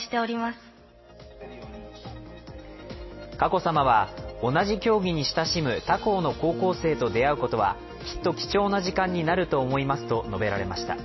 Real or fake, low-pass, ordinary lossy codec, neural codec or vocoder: real; 7.2 kHz; MP3, 24 kbps; none